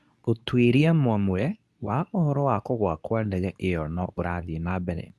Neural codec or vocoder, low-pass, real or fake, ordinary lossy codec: codec, 24 kHz, 0.9 kbps, WavTokenizer, medium speech release version 2; none; fake; none